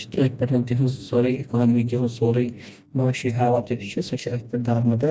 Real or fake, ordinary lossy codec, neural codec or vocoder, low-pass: fake; none; codec, 16 kHz, 1 kbps, FreqCodec, smaller model; none